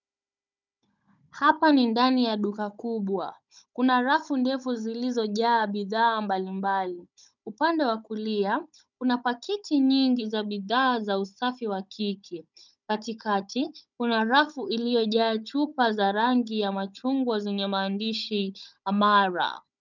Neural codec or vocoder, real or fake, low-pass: codec, 16 kHz, 16 kbps, FunCodec, trained on Chinese and English, 50 frames a second; fake; 7.2 kHz